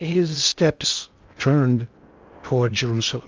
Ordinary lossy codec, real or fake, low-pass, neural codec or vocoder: Opus, 32 kbps; fake; 7.2 kHz; codec, 16 kHz in and 24 kHz out, 0.6 kbps, FocalCodec, streaming, 2048 codes